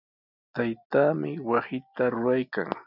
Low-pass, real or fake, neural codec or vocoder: 5.4 kHz; real; none